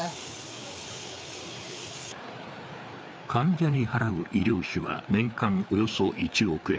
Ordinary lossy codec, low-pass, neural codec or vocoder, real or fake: none; none; codec, 16 kHz, 4 kbps, FreqCodec, larger model; fake